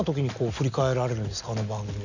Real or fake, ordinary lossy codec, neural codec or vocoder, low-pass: real; none; none; 7.2 kHz